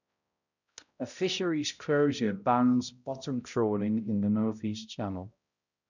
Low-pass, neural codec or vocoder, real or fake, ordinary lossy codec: 7.2 kHz; codec, 16 kHz, 0.5 kbps, X-Codec, HuBERT features, trained on balanced general audio; fake; none